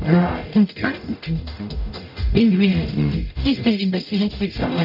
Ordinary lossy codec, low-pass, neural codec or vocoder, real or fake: none; 5.4 kHz; codec, 44.1 kHz, 0.9 kbps, DAC; fake